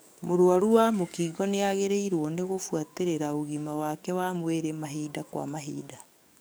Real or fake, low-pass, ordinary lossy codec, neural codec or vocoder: fake; none; none; codec, 44.1 kHz, 7.8 kbps, DAC